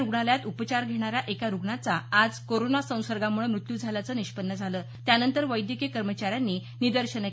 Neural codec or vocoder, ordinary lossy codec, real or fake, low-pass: none; none; real; none